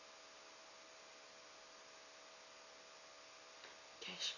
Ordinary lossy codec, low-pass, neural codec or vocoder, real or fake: AAC, 48 kbps; 7.2 kHz; none; real